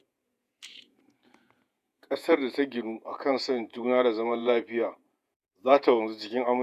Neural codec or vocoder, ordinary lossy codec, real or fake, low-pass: vocoder, 48 kHz, 128 mel bands, Vocos; none; fake; 14.4 kHz